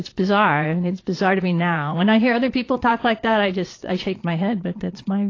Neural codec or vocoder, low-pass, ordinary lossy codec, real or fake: vocoder, 22.05 kHz, 80 mel bands, Vocos; 7.2 kHz; AAC, 32 kbps; fake